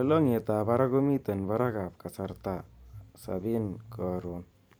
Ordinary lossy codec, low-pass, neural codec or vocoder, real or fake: none; none; vocoder, 44.1 kHz, 128 mel bands every 512 samples, BigVGAN v2; fake